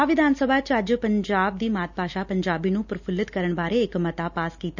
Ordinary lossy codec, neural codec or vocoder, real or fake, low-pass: none; none; real; 7.2 kHz